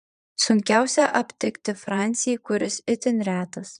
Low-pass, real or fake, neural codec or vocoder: 9.9 kHz; fake; vocoder, 22.05 kHz, 80 mel bands, Vocos